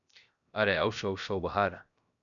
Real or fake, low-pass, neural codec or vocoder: fake; 7.2 kHz; codec, 16 kHz, 0.7 kbps, FocalCodec